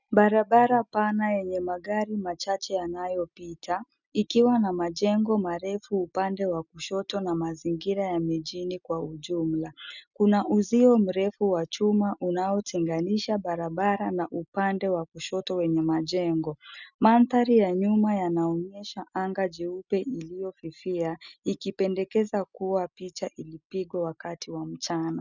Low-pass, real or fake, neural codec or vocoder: 7.2 kHz; real; none